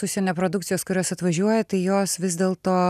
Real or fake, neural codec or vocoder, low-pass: real; none; 14.4 kHz